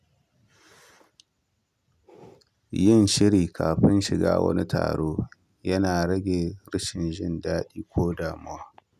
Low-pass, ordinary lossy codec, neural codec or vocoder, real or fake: 14.4 kHz; none; none; real